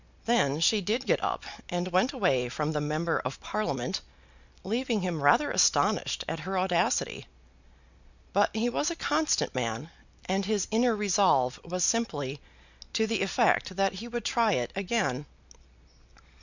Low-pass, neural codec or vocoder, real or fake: 7.2 kHz; none; real